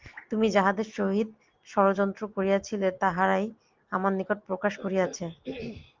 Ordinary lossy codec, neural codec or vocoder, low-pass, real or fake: Opus, 32 kbps; none; 7.2 kHz; real